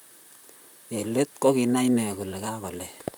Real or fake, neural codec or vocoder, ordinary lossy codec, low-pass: fake; vocoder, 44.1 kHz, 128 mel bands, Pupu-Vocoder; none; none